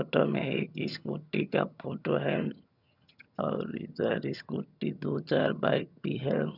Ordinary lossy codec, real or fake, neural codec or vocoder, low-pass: none; fake; vocoder, 22.05 kHz, 80 mel bands, HiFi-GAN; 5.4 kHz